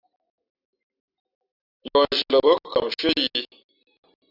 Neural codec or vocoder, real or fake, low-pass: none; real; 5.4 kHz